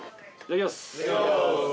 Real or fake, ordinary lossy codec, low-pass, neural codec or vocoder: real; none; none; none